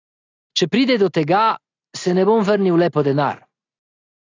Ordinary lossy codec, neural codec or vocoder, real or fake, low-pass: AAC, 32 kbps; none; real; 7.2 kHz